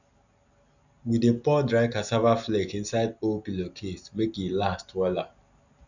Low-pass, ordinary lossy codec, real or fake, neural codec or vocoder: 7.2 kHz; none; real; none